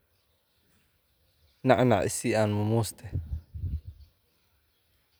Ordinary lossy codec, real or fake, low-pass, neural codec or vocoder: none; real; none; none